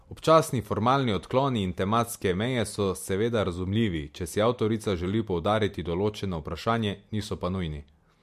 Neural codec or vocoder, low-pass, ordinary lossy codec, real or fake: none; 14.4 kHz; MP3, 64 kbps; real